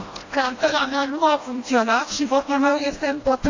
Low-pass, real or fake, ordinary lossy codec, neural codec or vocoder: 7.2 kHz; fake; AAC, 48 kbps; codec, 16 kHz, 1 kbps, FreqCodec, smaller model